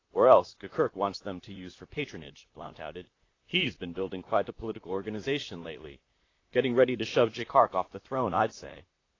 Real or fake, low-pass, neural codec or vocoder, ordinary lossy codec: fake; 7.2 kHz; vocoder, 44.1 kHz, 128 mel bands, Pupu-Vocoder; AAC, 32 kbps